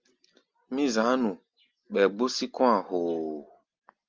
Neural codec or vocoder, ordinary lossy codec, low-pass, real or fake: none; Opus, 32 kbps; 7.2 kHz; real